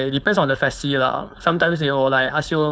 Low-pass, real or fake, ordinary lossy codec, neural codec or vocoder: none; fake; none; codec, 16 kHz, 4.8 kbps, FACodec